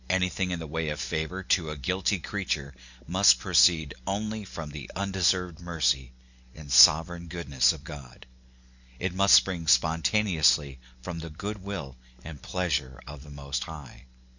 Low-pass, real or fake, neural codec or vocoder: 7.2 kHz; real; none